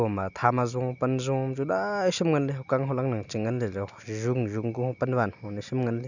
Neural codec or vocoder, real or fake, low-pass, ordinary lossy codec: none; real; 7.2 kHz; none